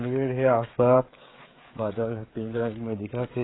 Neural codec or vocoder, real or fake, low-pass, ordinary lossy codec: codec, 16 kHz, 16 kbps, FreqCodec, smaller model; fake; 7.2 kHz; AAC, 16 kbps